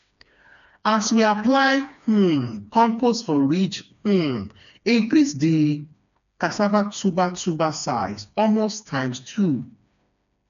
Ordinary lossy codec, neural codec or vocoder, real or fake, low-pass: none; codec, 16 kHz, 2 kbps, FreqCodec, smaller model; fake; 7.2 kHz